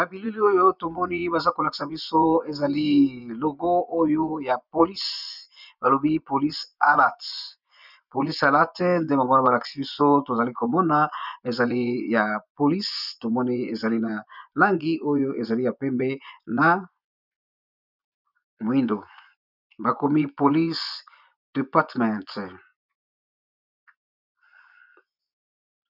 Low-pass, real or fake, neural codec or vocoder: 5.4 kHz; fake; vocoder, 24 kHz, 100 mel bands, Vocos